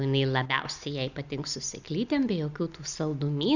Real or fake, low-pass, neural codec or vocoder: real; 7.2 kHz; none